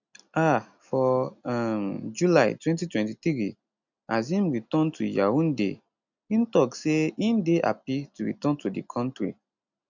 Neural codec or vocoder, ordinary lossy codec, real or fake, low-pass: none; none; real; 7.2 kHz